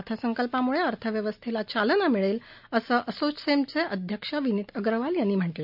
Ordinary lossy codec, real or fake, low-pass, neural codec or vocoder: none; real; 5.4 kHz; none